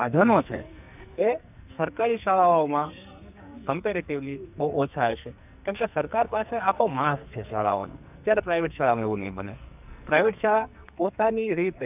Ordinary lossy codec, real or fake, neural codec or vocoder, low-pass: none; fake; codec, 44.1 kHz, 2.6 kbps, SNAC; 3.6 kHz